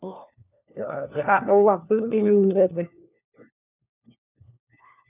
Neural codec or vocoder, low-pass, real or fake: codec, 16 kHz, 1 kbps, FunCodec, trained on LibriTTS, 50 frames a second; 3.6 kHz; fake